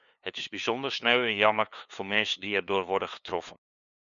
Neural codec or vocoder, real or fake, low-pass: codec, 16 kHz, 2 kbps, FunCodec, trained on LibriTTS, 25 frames a second; fake; 7.2 kHz